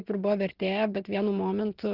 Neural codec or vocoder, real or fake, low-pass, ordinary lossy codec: none; real; 5.4 kHz; Opus, 16 kbps